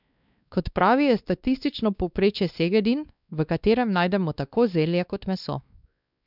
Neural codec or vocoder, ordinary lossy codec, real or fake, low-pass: codec, 16 kHz, 2 kbps, X-Codec, WavLM features, trained on Multilingual LibriSpeech; none; fake; 5.4 kHz